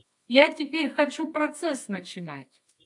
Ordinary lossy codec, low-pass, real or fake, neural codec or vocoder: AAC, 64 kbps; 10.8 kHz; fake; codec, 24 kHz, 0.9 kbps, WavTokenizer, medium music audio release